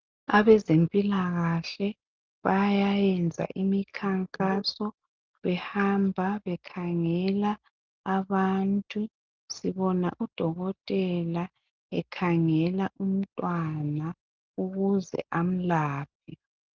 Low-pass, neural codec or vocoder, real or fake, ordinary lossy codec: 7.2 kHz; none; real; Opus, 24 kbps